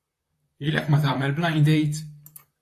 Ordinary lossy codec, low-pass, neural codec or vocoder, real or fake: AAC, 64 kbps; 14.4 kHz; vocoder, 44.1 kHz, 128 mel bands, Pupu-Vocoder; fake